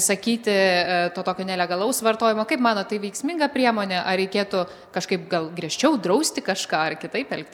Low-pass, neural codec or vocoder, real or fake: 19.8 kHz; none; real